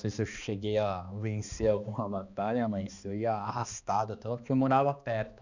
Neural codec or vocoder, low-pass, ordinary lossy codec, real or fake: codec, 16 kHz, 2 kbps, X-Codec, HuBERT features, trained on balanced general audio; 7.2 kHz; none; fake